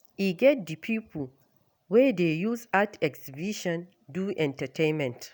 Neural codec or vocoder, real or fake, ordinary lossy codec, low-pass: none; real; none; none